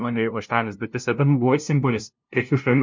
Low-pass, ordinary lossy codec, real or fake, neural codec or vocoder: 7.2 kHz; MP3, 64 kbps; fake; codec, 16 kHz, 0.5 kbps, FunCodec, trained on LibriTTS, 25 frames a second